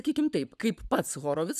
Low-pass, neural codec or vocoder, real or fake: 14.4 kHz; codec, 44.1 kHz, 7.8 kbps, Pupu-Codec; fake